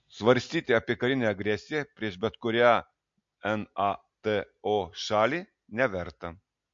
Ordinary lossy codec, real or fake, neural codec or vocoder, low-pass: MP3, 48 kbps; real; none; 7.2 kHz